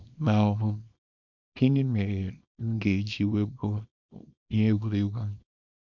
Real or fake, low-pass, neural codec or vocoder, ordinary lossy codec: fake; 7.2 kHz; codec, 24 kHz, 0.9 kbps, WavTokenizer, small release; MP3, 64 kbps